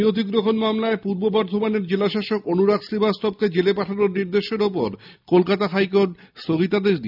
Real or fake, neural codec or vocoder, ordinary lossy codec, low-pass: real; none; none; 5.4 kHz